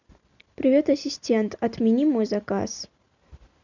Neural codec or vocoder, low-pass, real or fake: none; 7.2 kHz; real